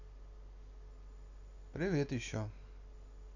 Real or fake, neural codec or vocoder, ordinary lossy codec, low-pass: real; none; none; 7.2 kHz